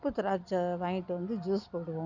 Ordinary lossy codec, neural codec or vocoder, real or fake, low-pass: none; none; real; 7.2 kHz